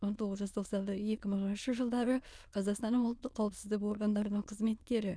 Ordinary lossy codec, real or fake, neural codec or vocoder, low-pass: none; fake; autoencoder, 22.05 kHz, a latent of 192 numbers a frame, VITS, trained on many speakers; none